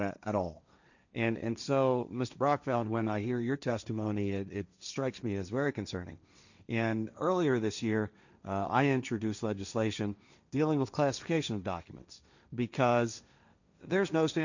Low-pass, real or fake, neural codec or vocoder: 7.2 kHz; fake; codec, 16 kHz, 1.1 kbps, Voila-Tokenizer